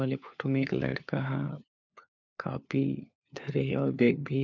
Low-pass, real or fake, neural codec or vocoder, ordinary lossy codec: 7.2 kHz; fake; codec, 16 kHz, 4 kbps, FunCodec, trained on LibriTTS, 50 frames a second; none